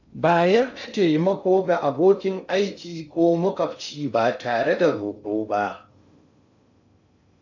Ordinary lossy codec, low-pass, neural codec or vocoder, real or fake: AAC, 48 kbps; 7.2 kHz; codec, 16 kHz in and 24 kHz out, 0.6 kbps, FocalCodec, streaming, 2048 codes; fake